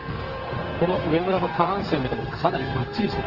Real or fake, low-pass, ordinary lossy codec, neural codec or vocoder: fake; 5.4 kHz; Opus, 16 kbps; codec, 44.1 kHz, 2.6 kbps, SNAC